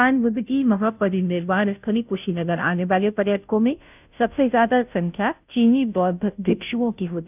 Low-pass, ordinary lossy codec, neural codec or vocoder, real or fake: 3.6 kHz; none; codec, 16 kHz, 0.5 kbps, FunCodec, trained on Chinese and English, 25 frames a second; fake